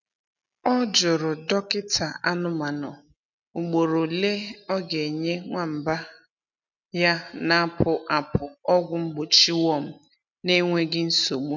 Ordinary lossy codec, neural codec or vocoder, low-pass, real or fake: none; none; 7.2 kHz; real